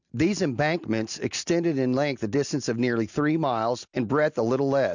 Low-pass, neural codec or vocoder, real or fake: 7.2 kHz; none; real